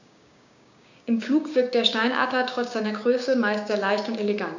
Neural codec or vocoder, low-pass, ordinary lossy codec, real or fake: codec, 16 kHz, 6 kbps, DAC; 7.2 kHz; none; fake